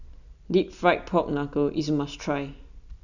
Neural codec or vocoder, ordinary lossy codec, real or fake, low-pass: none; none; real; 7.2 kHz